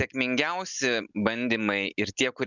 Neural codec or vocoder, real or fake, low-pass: none; real; 7.2 kHz